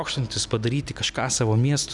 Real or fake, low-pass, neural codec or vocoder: real; 10.8 kHz; none